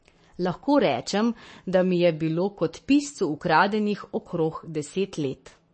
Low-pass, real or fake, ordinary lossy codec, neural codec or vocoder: 9.9 kHz; fake; MP3, 32 kbps; codec, 44.1 kHz, 7.8 kbps, Pupu-Codec